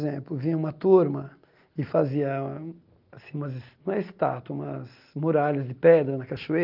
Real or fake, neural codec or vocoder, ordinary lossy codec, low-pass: real; none; Opus, 32 kbps; 5.4 kHz